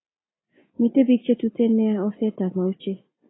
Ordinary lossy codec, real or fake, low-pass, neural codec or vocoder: AAC, 16 kbps; real; 7.2 kHz; none